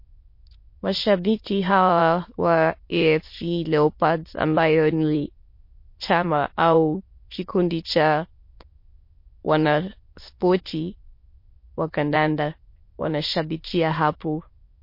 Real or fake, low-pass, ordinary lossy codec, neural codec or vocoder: fake; 5.4 kHz; MP3, 32 kbps; autoencoder, 22.05 kHz, a latent of 192 numbers a frame, VITS, trained on many speakers